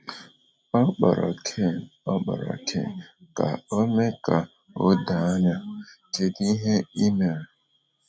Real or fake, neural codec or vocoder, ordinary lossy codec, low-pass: real; none; none; none